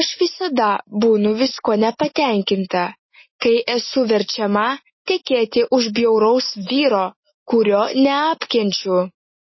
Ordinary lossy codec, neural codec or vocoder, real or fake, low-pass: MP3, 24 kbps; none; real; 7.2 kHz